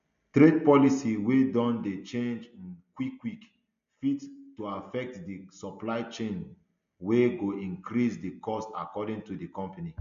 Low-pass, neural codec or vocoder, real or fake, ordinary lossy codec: 7.2 kHz; none; real; MP3, 64 kbps